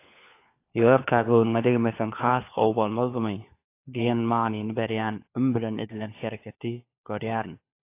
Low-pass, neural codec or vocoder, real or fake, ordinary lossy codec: 3.6 kHz; codec, 16 kHz, 4 kbps, FunCodec, trained on LibriTTS, 50 frames a second; fake; AAC, 24 kbps